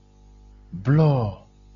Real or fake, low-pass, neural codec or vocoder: real; 7.2 kHz; none